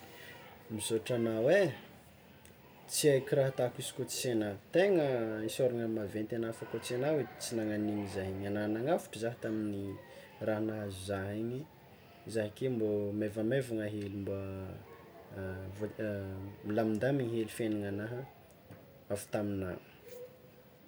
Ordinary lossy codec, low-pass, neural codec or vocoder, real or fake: none; none; none; real